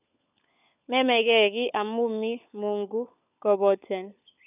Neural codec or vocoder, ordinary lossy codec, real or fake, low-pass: codec, 16 kHz, 16 kbps, FunCodec, trained on LibriTTS, 50 frames a second; none; fake; 3.6 kHz